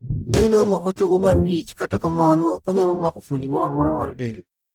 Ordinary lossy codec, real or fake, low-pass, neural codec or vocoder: none; fake; 19.8 kHz; codec, 44.1 kHz, 0.9 kbps, DAC